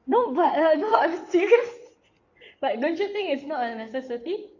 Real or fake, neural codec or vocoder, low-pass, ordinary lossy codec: fake; codec, 16 kHz in and 24 kHz out, 2.2 kbps, FireRedTTS-2 codec; 7.2 kHz; AAC, 48 kbps